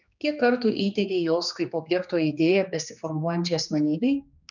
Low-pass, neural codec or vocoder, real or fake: 7.2 kHz; codec, 16 kHz, 2 kbps, X-Codec, HuBERT features, trained on general audio; fake